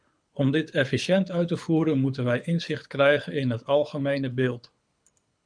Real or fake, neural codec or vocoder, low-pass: fake; codec, 24 kHz, 6 kbps, HILCodec; 9.9 kHz